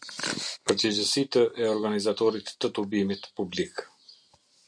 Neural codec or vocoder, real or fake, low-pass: none; real; 9.9 kHz